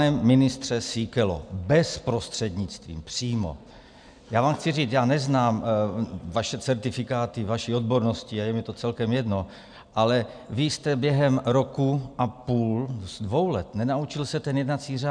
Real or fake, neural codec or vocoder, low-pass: real; none; 9.9 kHz